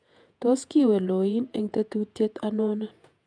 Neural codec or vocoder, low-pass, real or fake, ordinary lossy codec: vocoder, 48 kHz, 128 mel bands, Vocos; 9.9 kHz; fake; none